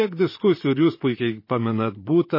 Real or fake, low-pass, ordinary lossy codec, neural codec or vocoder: fake; 5.4 kHz; MP3, 24 kbps; vocoder, 44.1 kHz, 128 mel bands, Pupu-Vocoder